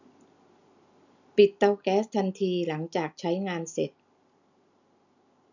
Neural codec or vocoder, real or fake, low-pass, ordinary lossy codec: none; real; 7.2 kHz; none